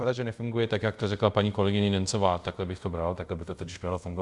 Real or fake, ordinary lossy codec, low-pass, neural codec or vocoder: fake; Opus, 64 kbps; 10.8 kHz; codec, 24 kHz, 0.5 kbps, DualCodec